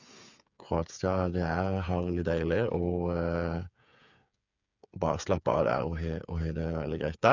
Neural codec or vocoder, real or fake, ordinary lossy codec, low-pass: codec, 16 kHz, 8 kbps, FreqCodec, smaller model; fake; none; 7.2 kHz